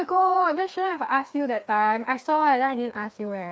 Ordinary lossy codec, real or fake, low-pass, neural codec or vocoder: none; fake; none; codec, 16 kHz, 2 kbps, FreqCodec, larger model